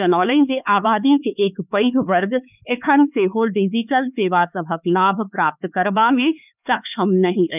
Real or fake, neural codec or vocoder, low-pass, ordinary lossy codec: fake; codec, 16 kHz, 4 kbps, X-Codec, HuBERT features, trained on LibriSpeech; 3.6 kHz; none